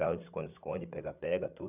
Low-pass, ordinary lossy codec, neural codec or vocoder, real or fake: 3.6 kHz; none; codec, 16 kHz, 8 kbps, FreqCodec, larger model; fake